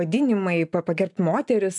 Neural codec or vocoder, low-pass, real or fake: none; 10.8 kHz; real